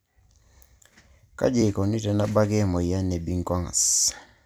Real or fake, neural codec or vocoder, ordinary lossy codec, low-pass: fake; vocoder, 44.1 kHz, 128 mel bands every 256 samples, BigVGAN v2; none; none